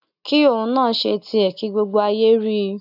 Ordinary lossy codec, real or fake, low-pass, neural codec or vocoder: none; real; 5.4 kHz; none